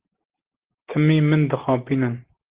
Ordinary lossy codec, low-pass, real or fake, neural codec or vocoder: Opus, 16 kbps; 3.6 kHz; real; none